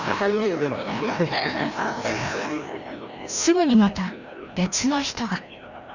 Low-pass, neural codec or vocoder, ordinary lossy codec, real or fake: 7.2 kHz; codec, 16 kHz, 1 kbps, FreqCodec, larger model; none; fake